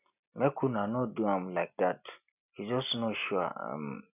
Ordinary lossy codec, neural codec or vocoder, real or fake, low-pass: none; none; real; 3.6 kHz